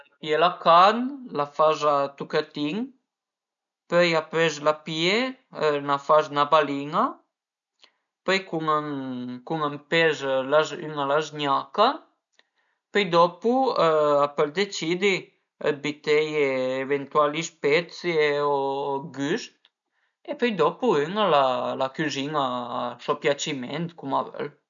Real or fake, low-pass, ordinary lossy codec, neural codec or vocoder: real; 7.2 kHz; none; none